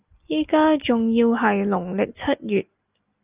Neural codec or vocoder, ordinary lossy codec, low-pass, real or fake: none; Opus, 24 kbps; 3.6 kHz; real